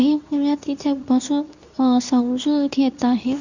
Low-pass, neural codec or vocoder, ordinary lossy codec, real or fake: 7.2 kHz; codec, 24 kHz, 0.9 kbps, WavTokenizer, medium speech release version 2; none; fake